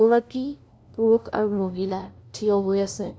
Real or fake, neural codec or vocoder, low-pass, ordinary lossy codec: fake; codec, 16 kHz, 0.5 kbps, FunCodec, trained on LibriTTS, 25 frames a second; none; none